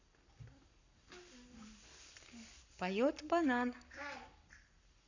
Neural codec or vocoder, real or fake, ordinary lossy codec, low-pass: codec, 44.1 kHz, 7.8 kbps, Pupu-Codec; fake; none; 7.2 kHz